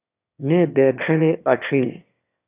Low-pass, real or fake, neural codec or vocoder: 3.6 kHz; fake; autoencoder, 22.05 kHz, a latent of 192 numbers a frame, VITS, trained on one speaker